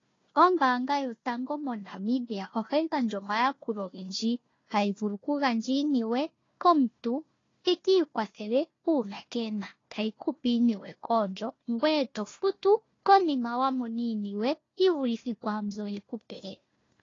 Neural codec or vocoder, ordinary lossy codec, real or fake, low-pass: codec, 16 kHz, 1 kbps, FunCodec, trained on Chinese and English, 50 frames a second; AAC, 32 kbps; fake; 7.2 kHz